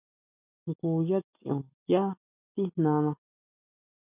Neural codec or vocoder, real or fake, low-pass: none; real; 3.6 kHz